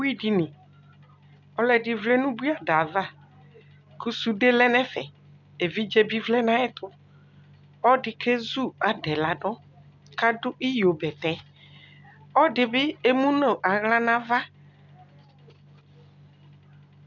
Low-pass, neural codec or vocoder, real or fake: 7.2 kHz; none; real